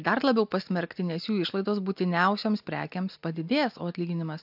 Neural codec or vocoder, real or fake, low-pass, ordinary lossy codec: none; real; 5.4 kHz; AAC, 48 kbps